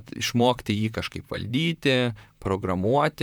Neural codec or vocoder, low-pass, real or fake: vocoder, 44.1 kHz, 128 mel bands, Pupu-Vocoder; 19.8 kHz; fake